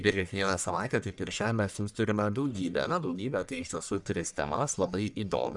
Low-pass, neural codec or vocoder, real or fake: 10.8 kHz; codec, 44.1 kHz, 1.7 kbps, Pupu-Codec; fake